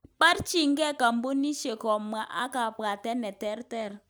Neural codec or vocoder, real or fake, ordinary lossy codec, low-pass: none; real; none; none